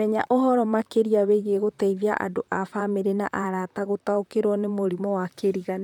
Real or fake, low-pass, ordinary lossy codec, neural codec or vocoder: fake; 19.8 kHz; none; vocoder, 44.1 kHz, 128 mel bands, Pupu-Vocoder